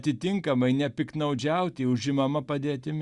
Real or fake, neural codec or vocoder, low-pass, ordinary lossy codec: real; none; 10.8 kHz; Opus, 64 kbps